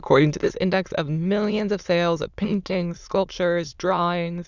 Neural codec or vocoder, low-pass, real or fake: autoencoder, 22.05 kHz, a latent of 192 numbers a frame, VITS, trained on many speakers; 7.2 kHz; fake